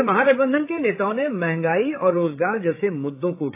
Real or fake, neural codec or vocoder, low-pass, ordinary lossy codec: fake; codec, 16 kHz, 8 kbps, FreqCodec, larger model; 3.6 kHz; AAC, 24 kbps